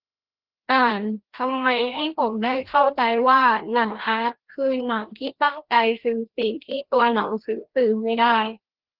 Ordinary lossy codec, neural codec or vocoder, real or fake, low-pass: Opus, 16 kbps; codec, 16 kHz, 1 kbps, FreqCodec, larger model; fake; 5.4 kHz